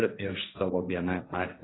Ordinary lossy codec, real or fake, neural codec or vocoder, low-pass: AAC, 16 kbps; fake; codec, 16 kHz, 1.1 kbps, Voila-Tokenizer; 7.2 kHz